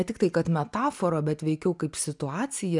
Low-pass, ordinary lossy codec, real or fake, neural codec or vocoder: 10.8 kHz; MP3, 96 kbps; real; none